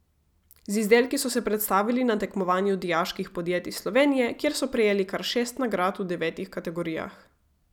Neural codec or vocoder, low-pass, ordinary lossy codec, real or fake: none; 19.8 kHz; none; real